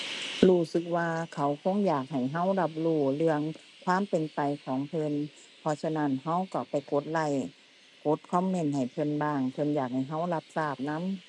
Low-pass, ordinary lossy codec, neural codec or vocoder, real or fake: 10.8 kHz; none; none; real